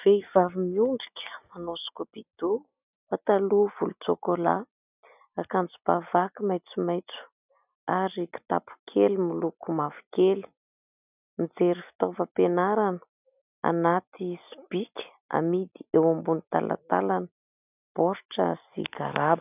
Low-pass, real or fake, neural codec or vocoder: 3.6 kHz; real; none